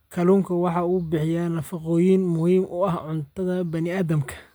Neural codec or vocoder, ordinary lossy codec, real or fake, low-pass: none; none; real; none